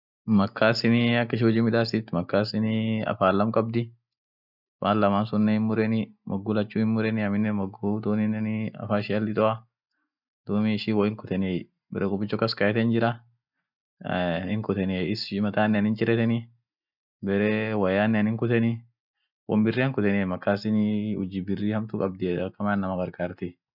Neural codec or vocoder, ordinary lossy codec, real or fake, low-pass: none; none; real; 5.4 kHz